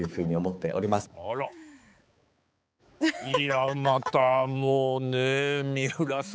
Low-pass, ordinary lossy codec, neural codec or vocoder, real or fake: none; none; codec, 16 kHz, 4 kbps, X-Codec, HuBERT features, trained on balanced general audio; fake